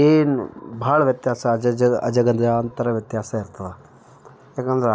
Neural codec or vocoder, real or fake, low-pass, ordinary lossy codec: none; real; none; none